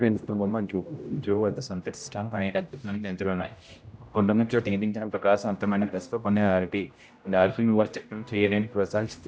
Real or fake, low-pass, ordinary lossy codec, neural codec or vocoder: fake; none; none; codec, 16 kHz, 0.5 kbps, X-Codec, HuBERT features, trained on general audio